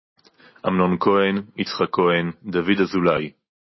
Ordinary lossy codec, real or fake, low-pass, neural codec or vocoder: MP3, 24 kbps; real; 7.2 kHz; none